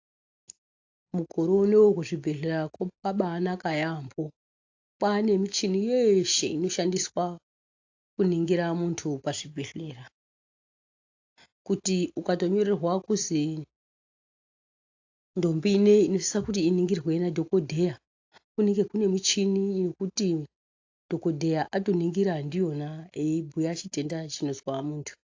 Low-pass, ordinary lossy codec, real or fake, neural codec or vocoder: 7.2 kHz; AAC, 48 kbps; real; none